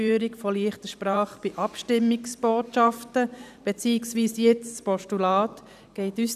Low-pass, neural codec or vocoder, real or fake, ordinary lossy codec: 14.4 kHz; vocoder, 44.1 kHz, 128 mel bands every 512 samples, BigVGAN v2; fake; none